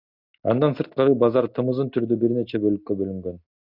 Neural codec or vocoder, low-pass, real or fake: none; 5.4 kHz; real